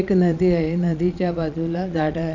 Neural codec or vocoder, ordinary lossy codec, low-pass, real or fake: vocoder, 22.05 kHz, 80 mel bands, Vocos; none; 7.2 kHz; fake